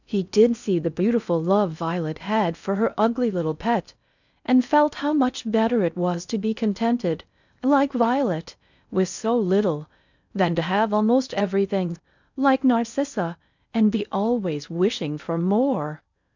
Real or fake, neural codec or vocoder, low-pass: fake; codec, 16 kHz in and 24 kHz out, 0.6 kbps, FocalCodec, streaming, 4096 codes; 7.2 kHz